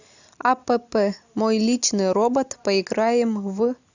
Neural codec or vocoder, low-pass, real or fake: none; 7.2 kHz; real